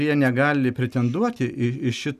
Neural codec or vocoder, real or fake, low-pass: none; real; 14.4 kHz